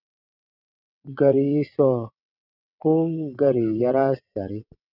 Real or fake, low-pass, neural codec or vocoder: fake; 5.4 kHz; vocoder, 22.05 kHz, 80 mel bands, WaveNeXt